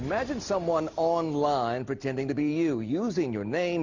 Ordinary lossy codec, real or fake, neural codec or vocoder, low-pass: Opus, 64 kbps; real; none; 7.2 kHz